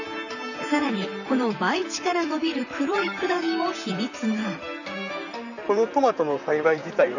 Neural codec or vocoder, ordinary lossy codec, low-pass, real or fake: vocoder, 44.1 kHz, 128 mel bands, Pupu-Vocoder; none; 7.2 kHz; fake